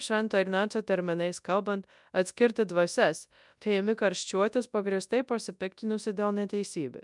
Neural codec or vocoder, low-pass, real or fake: codec, 24 kHz, 0.9 kbps, WavTokenizer, large speech release; 10.8 kHz; fake